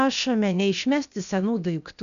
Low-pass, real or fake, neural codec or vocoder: 7.2 kHz; fake; codec, 16 kHz, about 1 kbps, DyCAST, with the encoder's durations